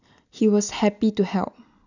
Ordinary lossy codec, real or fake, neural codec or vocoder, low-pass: none; real; none; 7.2 kHz